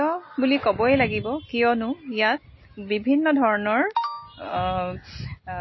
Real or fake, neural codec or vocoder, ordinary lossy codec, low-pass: real; none; MP3, 24 kbps; 7.2 kHz